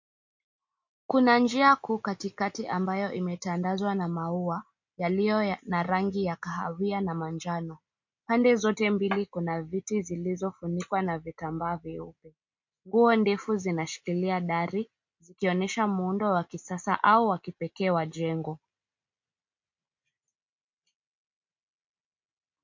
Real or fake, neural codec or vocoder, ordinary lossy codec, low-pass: real; none; MP3, 48 kbps; 7.2 kHz